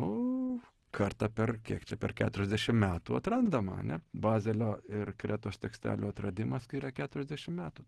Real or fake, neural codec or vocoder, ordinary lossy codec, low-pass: real; none; Opus, 16 kbps; 10.8 kHz